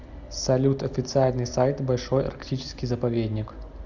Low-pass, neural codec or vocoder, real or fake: 7.2 kHz; none; real